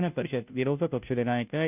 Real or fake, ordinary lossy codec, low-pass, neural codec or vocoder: fake; none; 3.6 kHz; codec, 16 kHz, 0.5 kbps, FunCodec, trained on Chinese and English, 25 frames a second